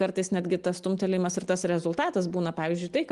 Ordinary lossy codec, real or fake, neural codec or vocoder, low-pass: Opus, 24 kbps; real; none; 10.8 kHz